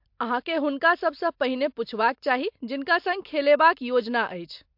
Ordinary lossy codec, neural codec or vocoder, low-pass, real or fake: MP3, 48 kbps; none; 5.4 kHz; real